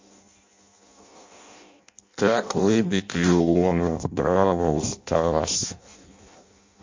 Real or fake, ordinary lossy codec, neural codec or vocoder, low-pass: fake; MP3, 48 kbps; codec, 16 kHz in and 24 kHz out, 0.6 kbps, FireRedTTS-2 codec; 7.2 kHz